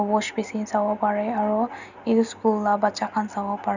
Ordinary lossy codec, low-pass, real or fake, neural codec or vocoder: none; 7.2 kHz; real; none